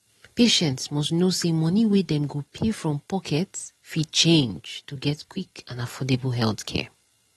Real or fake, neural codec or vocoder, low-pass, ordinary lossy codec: real; none; 19.8 kHz; AAC, 32 kbps